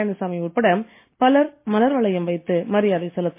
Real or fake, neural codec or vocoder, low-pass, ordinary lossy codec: real; none; 3.6 kHz; MP3, 24 kbps